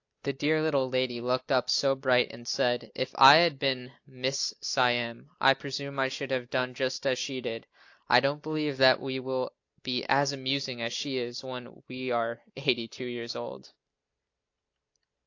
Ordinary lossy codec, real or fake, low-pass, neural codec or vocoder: AAC, 48 kbps; real; 7.2 kHz; none